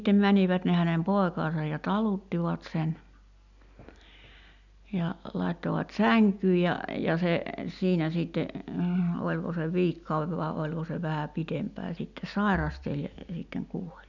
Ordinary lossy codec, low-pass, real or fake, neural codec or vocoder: none; 7.2 kHz; real; none